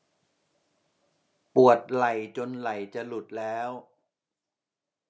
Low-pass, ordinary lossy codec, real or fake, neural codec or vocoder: none; none; real; none